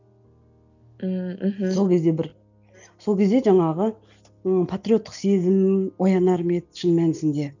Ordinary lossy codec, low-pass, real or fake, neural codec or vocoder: none; 7.2 kHz; real; none